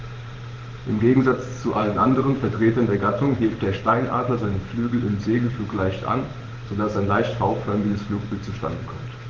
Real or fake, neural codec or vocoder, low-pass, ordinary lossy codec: fake; vocoder, 44.1 kHz, 128 mel bands every 512 samples, BigVGAN v2; 7.2 kHz; Opus, 16 kbps